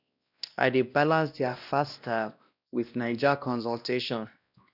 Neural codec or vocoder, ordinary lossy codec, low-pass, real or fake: codec, 16 kHz, 1 kbps, X-Codec, WavLM features, trained on Multilingual LibriSpeech; none; 5.4 kHz; fake